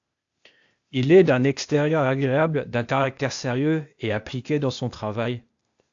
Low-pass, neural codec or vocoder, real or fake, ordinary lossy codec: 7.2 kHz; codec, 16 kHz, 0.8 kbps, ZipCodec; fake; AAC, 64 kbps